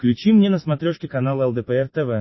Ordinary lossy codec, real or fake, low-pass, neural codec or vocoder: MP3, 24 kbps; real; 7.2 kHz; none